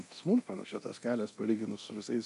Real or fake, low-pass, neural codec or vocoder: fake; 10.8 kHz; codec, 24 kHz, 0.9 kbps, DualCodec